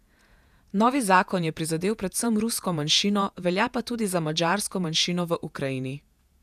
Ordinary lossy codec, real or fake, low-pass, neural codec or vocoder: none; fake; 14.4 kHz; vocoder, 48 kHz, 128 mel bands, Vocos